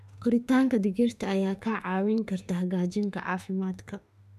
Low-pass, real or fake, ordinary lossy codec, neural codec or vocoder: 14.4 kHz; fake; none; autoencoder, 48 kHz, 32 numbers a frame, DAC-VAE, trained on Japanese speech